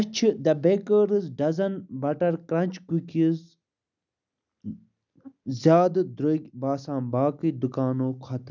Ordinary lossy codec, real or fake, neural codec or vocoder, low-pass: none; real; none; 7.2 kHz